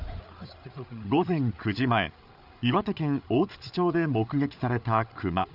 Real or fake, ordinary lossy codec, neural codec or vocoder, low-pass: fake; none; codec, 16 kHz, 8 kbps, FreqCodec, larger model; 5.4 kHz